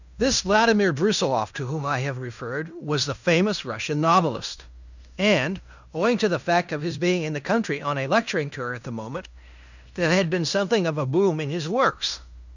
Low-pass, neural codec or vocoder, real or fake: 7.2 kHz; codec, 16 kHz in and 24 kHz out, 0.9 kbps, LongCat-Audio-Codec, fine tuned four codebook decoder; fake